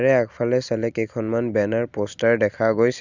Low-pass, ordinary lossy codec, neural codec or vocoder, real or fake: 7.2 kHz; Opus, 64 kbps; none; real